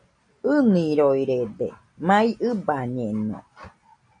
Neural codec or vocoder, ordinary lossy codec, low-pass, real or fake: none; AAC, 64 kbps; 9.9 kHz; real